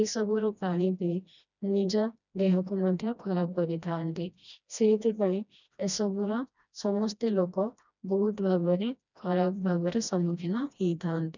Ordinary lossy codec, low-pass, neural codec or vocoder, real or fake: none; 7.2 kHz; codec, 16 kHz, 1 kbps, FreqCodec, smaller model; fake